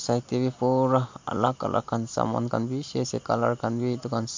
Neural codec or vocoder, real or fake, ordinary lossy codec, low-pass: none; real; MP3, 48 kbps; 7.2 kHz